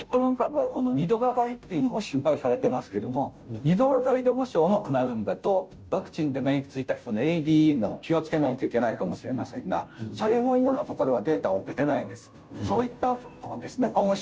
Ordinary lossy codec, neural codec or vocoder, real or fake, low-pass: none; codec, 16 kHz, 0.5 kbps, FunCodec, trained on Chinese and English, 25 frames a second; fake; none